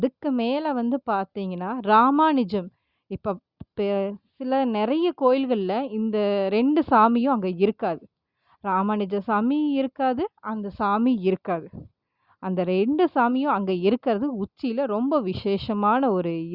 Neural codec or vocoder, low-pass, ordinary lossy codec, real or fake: none; 5.4 kHz; Opus, 64 kbps; real